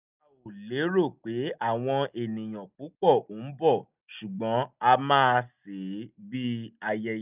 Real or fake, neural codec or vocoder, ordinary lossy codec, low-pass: real; none; none; 3.6 kHz